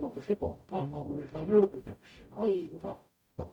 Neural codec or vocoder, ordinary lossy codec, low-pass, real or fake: codec, 44.1 kHz, 0.9 kbps, DAC; MP3, 96 kbps; 19.8 kHz; fake